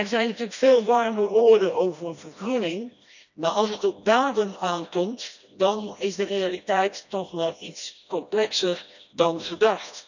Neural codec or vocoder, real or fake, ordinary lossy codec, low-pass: codec, 16 kHz, 1 kbps, FreqCodec, smaller model; fake; none; 7.2 kHz